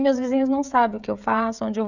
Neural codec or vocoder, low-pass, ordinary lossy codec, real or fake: codec, 16 kHz, 16 kbps, FreqCodec, smaller model; 7.2 kHz; none; fake